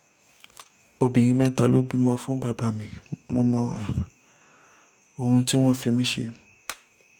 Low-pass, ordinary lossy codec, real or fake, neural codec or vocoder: 19.8 kHz; none; fake; codec, 44.1 kHz, 2.6 kbps, DAC